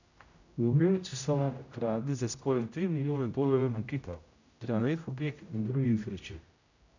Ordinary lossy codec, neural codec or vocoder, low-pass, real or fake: none; codec, 16 kHz, 0.5 kbps, X-Codec, HuBERT features, trained on general audio; 7.2 kHz; fake